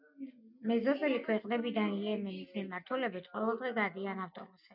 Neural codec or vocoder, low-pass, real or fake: none; 5.4 kHz; real